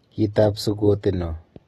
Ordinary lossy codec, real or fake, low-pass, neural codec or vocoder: AAC, 32 kbps; real; 19.8 kHz; none